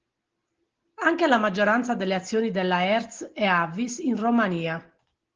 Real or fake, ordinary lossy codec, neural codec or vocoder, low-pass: real; Opus, 16 kbps; none; 7.2 kHz